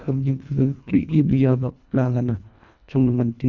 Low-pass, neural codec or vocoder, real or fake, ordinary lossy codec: 7.2 kHz; codec, 24 kHz, 1.5 kbps, HILCodec; fake; none